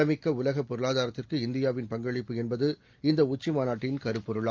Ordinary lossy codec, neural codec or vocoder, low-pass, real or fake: Opus, 32 kbps; none; 7.2 kHz; real